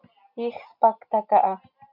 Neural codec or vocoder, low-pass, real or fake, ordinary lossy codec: none; 5.4 kHz; real; MP3, 48 kbps